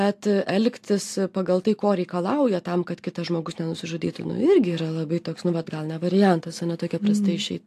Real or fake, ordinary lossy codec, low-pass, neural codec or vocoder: real; AAC, 64 kbps; 14.4 kHz; none